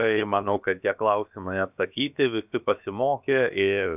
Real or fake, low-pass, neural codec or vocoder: fake; 3.6 kHz; codec, 16 kHz, about 1 kbps, DyCAST, with the encoder's durations